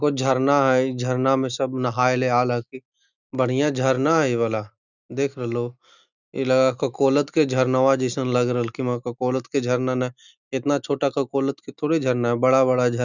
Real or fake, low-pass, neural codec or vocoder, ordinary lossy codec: real; 7.2 kHz; none; none